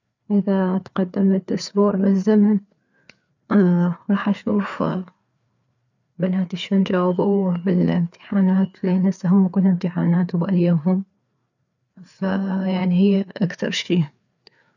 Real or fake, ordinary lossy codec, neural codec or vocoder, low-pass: fake; none; codec, 16 kHz, 4 kbps, FreqCodec, larger model; 7.2 kHz